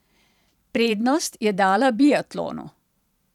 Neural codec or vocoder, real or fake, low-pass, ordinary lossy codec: vocoder, 44.1 kHz, 128 mel bands every 512 samples, BigVGAN v2; fake; 19.8 kHz; none